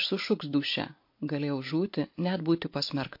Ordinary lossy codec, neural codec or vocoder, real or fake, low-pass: MP3, 32 kbps; none; real; 5.4 kHz